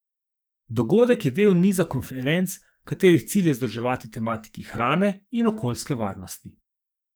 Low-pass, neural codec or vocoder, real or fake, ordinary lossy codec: none; codec, 44.1 kHz, 2.6 kbps, SNAC; fake; none